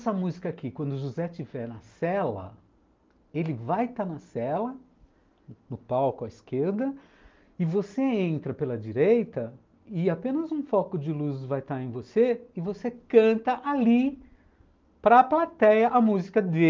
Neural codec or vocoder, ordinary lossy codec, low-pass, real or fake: none; Opus, 24 kbps; 7.2 kHz; real